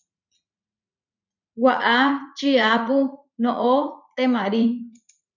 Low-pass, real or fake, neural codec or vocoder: 7.2 kHz; fake; vocoder, 44.1 kHz, 80 mel bands, Vocos